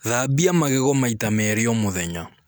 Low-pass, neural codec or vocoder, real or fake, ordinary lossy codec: none; none; real; none